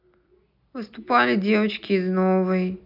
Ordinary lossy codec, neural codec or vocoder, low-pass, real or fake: none; none; 5.4 kHz; real